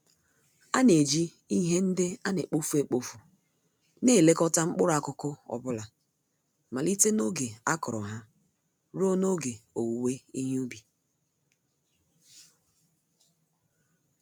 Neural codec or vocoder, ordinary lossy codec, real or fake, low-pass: none; none; real; none